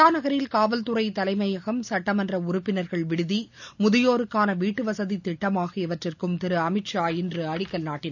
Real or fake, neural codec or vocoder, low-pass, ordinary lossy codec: real; none; 7.2 kHz; none